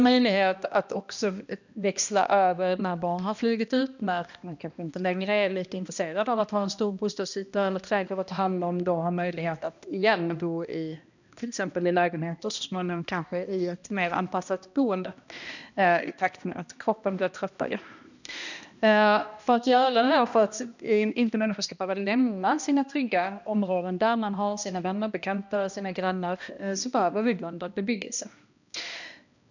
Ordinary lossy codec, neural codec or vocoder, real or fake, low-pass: none; codec, 16 kHz, 1 kbps, X-Codec, HuBERT features, trained on balanced general audio; fake; 7.2 kHz